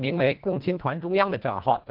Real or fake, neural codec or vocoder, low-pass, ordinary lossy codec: fake; codec, 24 kHz, 1.5 kbps, HILCodec; 5.4 kHz; Opus, 32 kbps